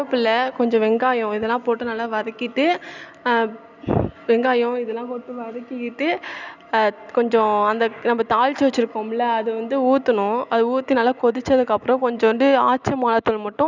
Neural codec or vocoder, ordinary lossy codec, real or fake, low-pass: none; none; real; 7.2 kHz